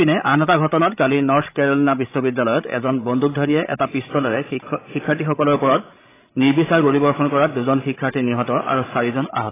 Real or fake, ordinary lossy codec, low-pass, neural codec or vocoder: fake; AAC, 16 kbps; 3.6 kHz; codec, 16 kHz, 16 kbps, FreqCodec, larger model